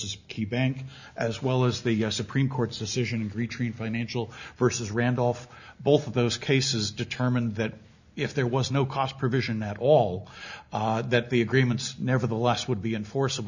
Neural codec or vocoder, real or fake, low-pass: none; real; 7.2 kHz